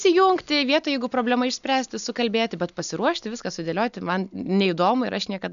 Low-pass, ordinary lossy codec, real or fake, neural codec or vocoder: 7.2 kHz; MP3, 96 kbps; real; none